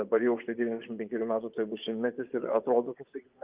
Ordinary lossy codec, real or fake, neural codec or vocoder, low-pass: Opus, 32 kbps; fake; vocoder, 24 kHz, 100 mel bands, Vocos; 3.6 kHz